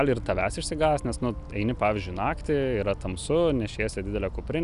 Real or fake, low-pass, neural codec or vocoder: real; 10.8 kHz; none